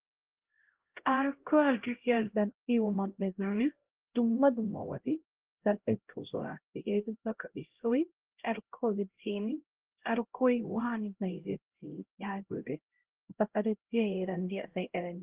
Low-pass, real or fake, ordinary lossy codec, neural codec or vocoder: 3.6 kHz; fake; Opus, 16 kbps; codec, 16 kHz, 0.5 kbps, X-Codec, HuBERT features, trained on LibriSpeech